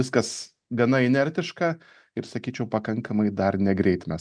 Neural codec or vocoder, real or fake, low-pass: none; real; 9.9 kHz